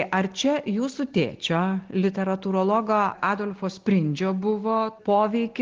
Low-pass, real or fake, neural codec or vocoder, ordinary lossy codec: 7.2 kHz; real; none; Opus, 16 kbps